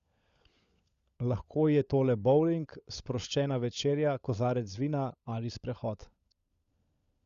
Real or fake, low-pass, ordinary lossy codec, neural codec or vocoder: fake; 7.2 kHz; Opus, 64 kbps; codec, 16 kHz, 16 kbps, FunCodec, trained on LibriTTS, 50 frames a second